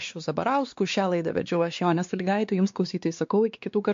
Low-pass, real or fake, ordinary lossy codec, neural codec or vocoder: 7.2 kHz; fake; MP3, 48 kbps; codec, 16 kHz, 2 kbps, X-Codec, WavLM features, trained on Multilingual LibriSpeech